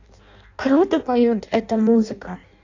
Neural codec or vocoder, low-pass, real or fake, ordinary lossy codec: codec, 16 kHz in and 24 kHz out, 0.6 kbps, FireRedTTS-2 codec; 7.2 kHz; fake; AAC, 48 kbps